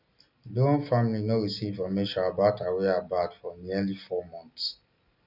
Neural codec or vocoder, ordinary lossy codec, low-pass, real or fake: none; none; 5.4 kHz; real